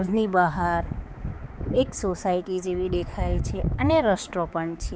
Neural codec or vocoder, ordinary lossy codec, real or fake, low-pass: codec, 16 kHz, 4 kbps, X-Codec, HuBERT features, trained on balanced general audio; none; fake; none